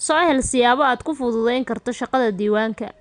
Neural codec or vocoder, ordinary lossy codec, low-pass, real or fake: none; none; 9.9 kHz; real